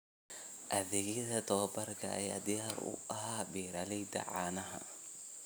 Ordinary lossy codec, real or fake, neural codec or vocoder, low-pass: none; real; none; none